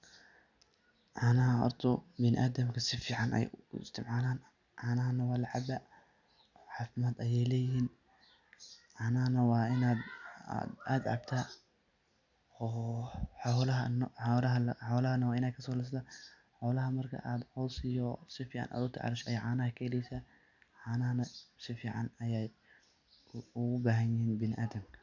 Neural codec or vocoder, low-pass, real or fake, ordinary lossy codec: none; 7.2 kHz; real; none